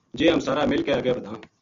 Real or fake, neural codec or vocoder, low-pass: real; none; 7.2 kHz